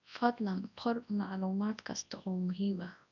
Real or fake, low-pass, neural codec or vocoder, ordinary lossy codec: fake; 7.2 kHz; codec, 24 kHz, 0.9 kbps, WavTokenizer, large speech release; none